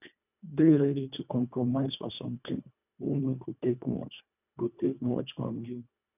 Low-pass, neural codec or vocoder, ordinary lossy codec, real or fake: 3.6 kHz; codec, 24 kHz, 1.5 kbps, HILCodec; none; fake